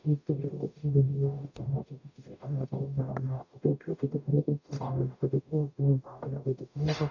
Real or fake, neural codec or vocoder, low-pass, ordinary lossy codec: fake; codec, 44.1 kHz, 0.9 kbps, DAC; 7.2 kHz; none